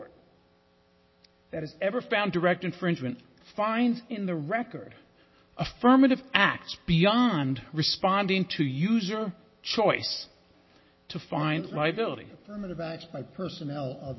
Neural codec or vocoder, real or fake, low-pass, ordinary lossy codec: none; real; 7.2 kHz; MP3, 24 kbps